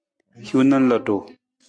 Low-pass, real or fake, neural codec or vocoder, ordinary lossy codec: 9.9 kHz; real; none; MP3, 64 kbps